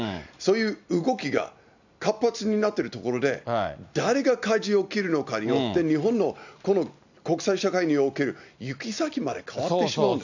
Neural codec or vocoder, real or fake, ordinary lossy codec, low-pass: none; real; none; 7.2 kHz